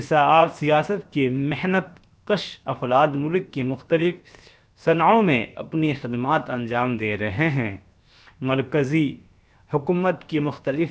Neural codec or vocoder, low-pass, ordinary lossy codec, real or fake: codec, 16 kHz, 0.7 kbps, FocalCodec; none; none; fake